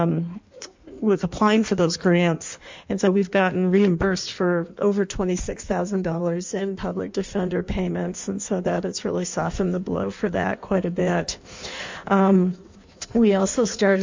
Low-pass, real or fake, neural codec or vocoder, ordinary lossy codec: 7.2 kHz; fake; codec, 16 kHz in and 24 kHz out, 1.1 kbps, FireRedTTS-2 codec; MP3, 64 kbps